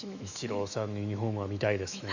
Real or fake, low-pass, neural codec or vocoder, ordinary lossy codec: fake; 7.2 kHz; autoencoder, 48 kHz, 128 numbers a frame, DAC-VAE, trained on Japanese speech; none